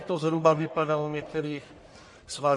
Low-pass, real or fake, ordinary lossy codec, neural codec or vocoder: 10.8 kHz; fake; MP3, 48 kbps; codec, 44.1 kHz, 1.7 kbps, Pupu-Codec